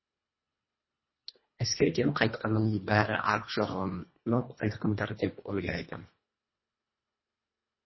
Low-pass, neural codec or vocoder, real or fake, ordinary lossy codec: 7.2 kHz; codec, 24 kHz, 1.5 kbps, HILCodec; fake; MP3, 24 kbps